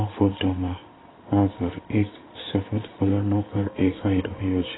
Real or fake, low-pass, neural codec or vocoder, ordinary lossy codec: fake; 7.2 kHz; codec, 16 kHz in and 24 kHz out, 1 kbps, XY-Tokenizer; AAC, 16 kbps